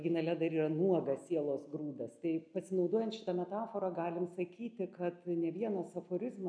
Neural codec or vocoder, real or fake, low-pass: none; real; 9.9 kHz